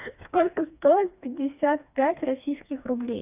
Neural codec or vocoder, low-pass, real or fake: codec, 16 kHz, 2 kbps, FreqCodec, smaller model; 3.6 kHz; fake